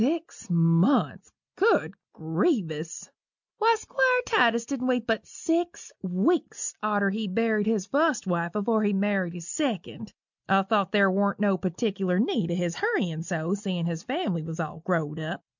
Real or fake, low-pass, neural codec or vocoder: real; 7.2 kHz; none